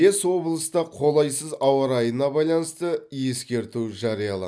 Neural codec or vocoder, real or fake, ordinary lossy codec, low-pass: none; real; none; none